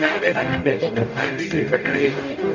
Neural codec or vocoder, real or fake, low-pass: codec, 44.1 kHz, 0.9 kbps, DAC; fake; 7.2 kHz